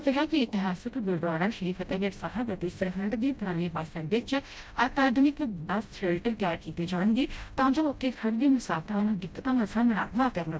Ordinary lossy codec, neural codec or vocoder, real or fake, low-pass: none; codec, 16 kHz, 0.5 kbps, FreqCodec, smaller model; fake; none